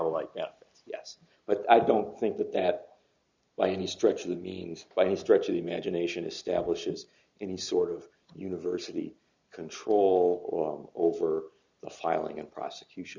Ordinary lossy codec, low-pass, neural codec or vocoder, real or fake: Opus, 64 kbps; 7.2 kHz; none; real